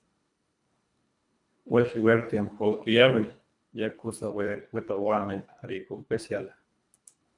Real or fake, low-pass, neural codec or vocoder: fake; 10.8 kHz; codec, 24 kHz, 1.5 kbps, HILCodec